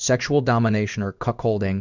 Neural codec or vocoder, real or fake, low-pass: codec, 16 kHz in and 24 kHz out, 1 kbps, XY-Tokenizer; fake; 7.2 kHz